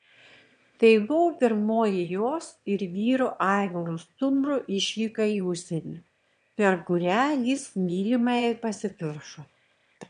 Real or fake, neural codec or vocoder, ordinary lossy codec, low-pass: fake; autoencoder, 22.05 kHz, a latent of 192 numbers a frame, VITS, trained on one speaker; MP3, 64 kbps; 9.9 kHz